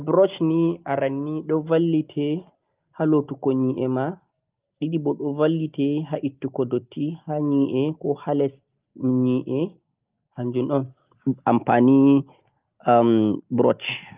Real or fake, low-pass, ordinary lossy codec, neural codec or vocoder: real; 3.6 kHz; Opus, 32 kbps; none